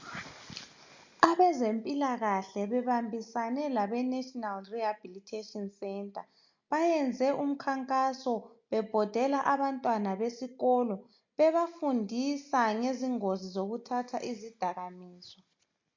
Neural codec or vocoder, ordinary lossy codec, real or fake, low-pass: none; MP3, 48 kbps; real; 7.2 kHz